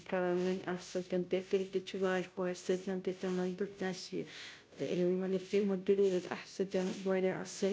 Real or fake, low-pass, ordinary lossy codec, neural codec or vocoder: fake; none; none; codec, 16 kHz, 0.5 kbps, FunCodec, trained on Chinese and English, 25 frames a second